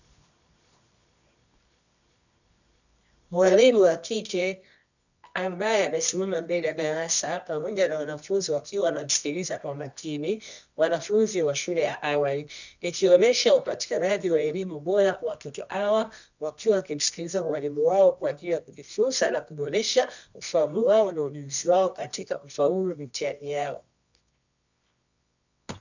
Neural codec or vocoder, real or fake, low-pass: codec, 24 kHz, 0.9 kbps, WavTokenizer, medium music audio release; fake; 7.2 kHz